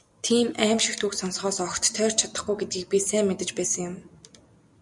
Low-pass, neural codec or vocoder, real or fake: 10.8 kHz; none; real